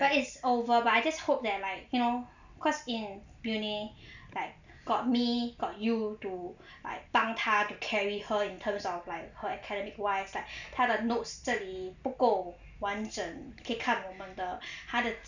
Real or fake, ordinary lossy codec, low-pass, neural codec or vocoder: real; none; 7.2 kHz; none